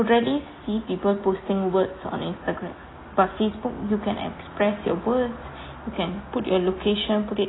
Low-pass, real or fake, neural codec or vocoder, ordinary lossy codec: 7.2 kHz; real; none; AAC, 16 kbps